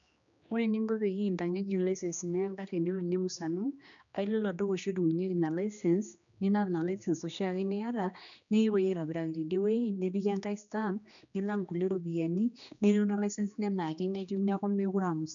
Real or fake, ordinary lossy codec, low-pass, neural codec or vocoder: fake; none; 7.2 kHz; codec, 16 kHz, 2 kbps, X-Codec, HuBERT features, trained on general audio